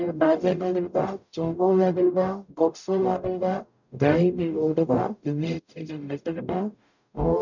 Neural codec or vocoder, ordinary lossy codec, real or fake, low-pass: codec, 44.1 kHz, 0.9 kbps, DAC; none; fake; 7.2 kHz